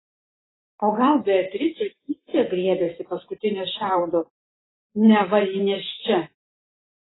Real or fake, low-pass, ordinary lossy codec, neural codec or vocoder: fake; 7.2 kHz; AAC, 16 kbps; vocoder, 44.1 kHz, 128 mel bands every 512 samples, BigVGAN v2